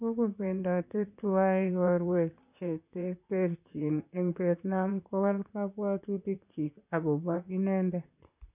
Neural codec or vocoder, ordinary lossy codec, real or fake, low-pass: vocoder, 44.1 kHz, 128 mel bands, Pupu-Vocoder; none; fake; 3.6 kHz